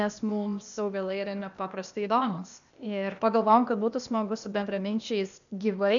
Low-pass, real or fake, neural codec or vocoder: 7.2 kHz; fake; codec, 16 kHz, 0.8 kbps, ZipCodec